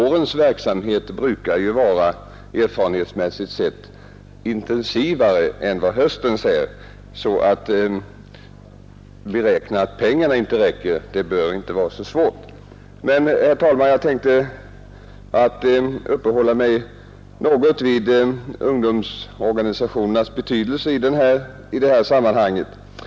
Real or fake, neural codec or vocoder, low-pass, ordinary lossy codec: real; none; none; none